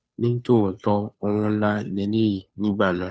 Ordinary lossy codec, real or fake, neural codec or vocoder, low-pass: none; fake; codec, 16 kHz, 2 kbps, FunCodec, trained on Chinese and English, 25 frames a second; none